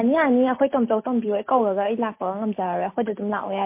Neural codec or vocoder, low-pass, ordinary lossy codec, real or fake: none; 3.6 kHz; MP3, 24 kbps; real